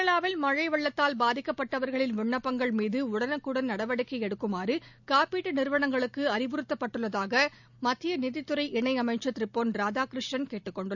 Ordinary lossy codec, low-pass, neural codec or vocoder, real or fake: none; 7.2 kHz; none; real